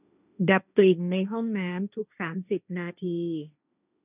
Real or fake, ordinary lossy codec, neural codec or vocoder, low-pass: fake; none; codec, 16 kHz, 1.1 kbps, Voila-Tokenizer; 3.6 kHz